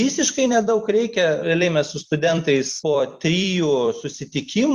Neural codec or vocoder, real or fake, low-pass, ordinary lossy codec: vocoder, 44.1 kHz, 128 mel bands every 256 samples, BigVGAN v2; fake; 14.4 kHz; Opus, 64 kbps